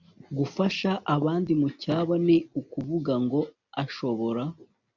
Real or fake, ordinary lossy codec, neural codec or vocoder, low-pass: real; MP3, 64 kbps; none; 7.2 kHz